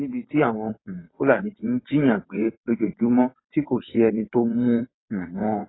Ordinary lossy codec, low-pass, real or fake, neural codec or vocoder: AAC, 16 kbps; 7.2 kHz; fake; vocoder, 22.05 kHz, 80 mel bands, WaveNeXt